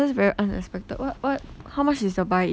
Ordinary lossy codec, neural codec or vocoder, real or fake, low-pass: none; none; real; none